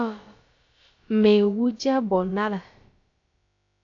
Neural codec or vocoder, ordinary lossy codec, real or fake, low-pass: codec, 16 kHz, about 1 kbps, DyCAST, with the encoder's durations; AAC, 48 kbps; fake; 7.2 kHz